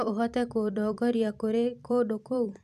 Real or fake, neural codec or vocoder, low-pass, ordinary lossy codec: fake; vocoder, 44.1 kHz, 128 mel bands every 256 samples, BigVGAN v2; 14.4 kHz; none